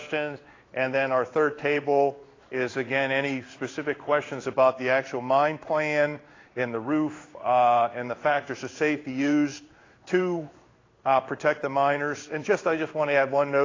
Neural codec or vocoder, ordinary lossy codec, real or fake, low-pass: codec, 16 kHz in and 24 kHz out, 1 kbps, XY-Tokenizer; AAC, 32 kbps; fake; 7.2 kHz